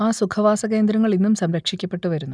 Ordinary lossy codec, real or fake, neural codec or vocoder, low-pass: none; real; none; 9.9 kHz